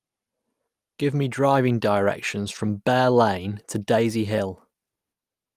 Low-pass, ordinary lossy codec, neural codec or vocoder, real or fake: 14.4 kHz; Opus, 32 kbps; none; real